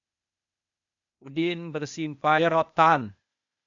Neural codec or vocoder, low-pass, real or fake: codec, 16 kHz, 0.8 kbps, ZipCodec; 7.2 kHz; fake